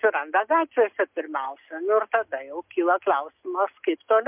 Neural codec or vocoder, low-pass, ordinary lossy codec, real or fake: none; 3.6 kHz; MP3, 32 kbps; real